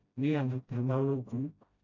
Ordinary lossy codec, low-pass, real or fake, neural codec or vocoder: none; 7.2 kHz; fake; codec, 16 kHz, 0.5 kbps, FreqCodec, smaller model